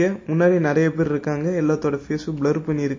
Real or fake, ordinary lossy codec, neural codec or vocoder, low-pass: real; MP3, 32 kbps; none; 7.2 kHz